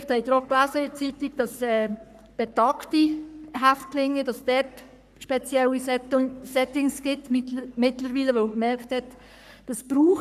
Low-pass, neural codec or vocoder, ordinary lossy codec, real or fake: 14.4 kHz; codec, 44.1 kHz, 3.4 kbps, Pupu-Codec; none; fake